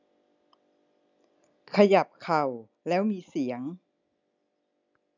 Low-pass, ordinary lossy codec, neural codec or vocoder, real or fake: 7.2 kHz; none; none; real